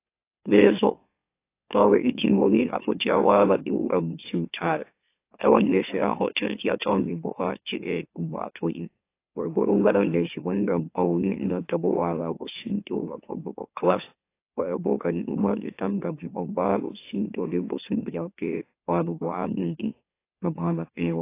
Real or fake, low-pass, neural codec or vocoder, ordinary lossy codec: fake; 3.6 kHz; autoencoder, 44.1 kHz, a latent of 192 numbers a frame, MeloTTS; AAC, 24 kbps